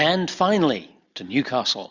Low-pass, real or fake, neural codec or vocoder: 7.2 kHz; real; none